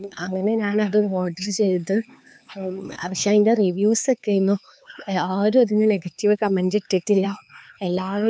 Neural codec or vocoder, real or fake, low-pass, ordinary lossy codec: codec, 16 kHz, 4 kbps, X-Codec, HuBERT features, trained on LibriSpeech; fake; none; none